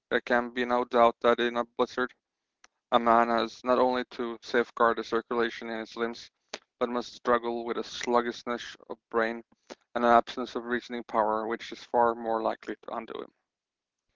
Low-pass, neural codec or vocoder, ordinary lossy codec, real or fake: 7.2 kHz; none; Opus, 16 kbps; real